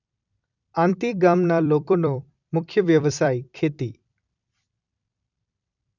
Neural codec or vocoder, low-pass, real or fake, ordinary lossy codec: vocoder, 44.1 kHz, 128 mel bands every 256 samples, BigVGAN v2; 7.2 kHz; fake; none